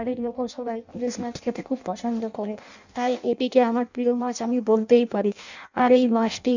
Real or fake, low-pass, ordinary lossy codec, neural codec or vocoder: fake; 7.2 kHz; none; codec, 16 kHz in and 24 kHz out, 0.6 kbps, FireRedTTS-2 codec